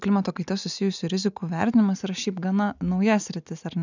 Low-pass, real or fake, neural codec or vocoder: 7.2 kHz; real; none